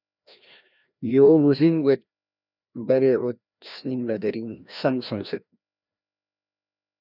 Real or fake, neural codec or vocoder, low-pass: fake; codec, 16 kHz, 1 kbps, FreqCodec, larger model; 5.4 kHz